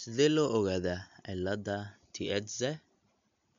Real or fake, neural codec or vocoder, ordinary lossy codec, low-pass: real; none; MP3, 64 kbps; 7.2 kHz